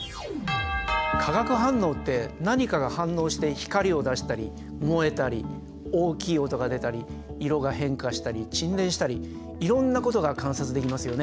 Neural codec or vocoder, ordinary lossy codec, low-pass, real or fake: none; none; none; real